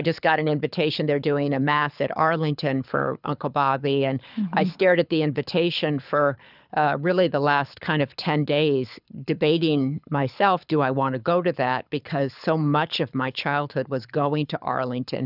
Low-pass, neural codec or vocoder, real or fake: 5.4 kHz; codec, 24 kHz, 6 kbps, HILCodec; fake